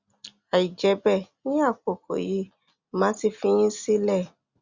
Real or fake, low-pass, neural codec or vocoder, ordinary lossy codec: real; 7.2 kHz; none; Opus, 64 kbps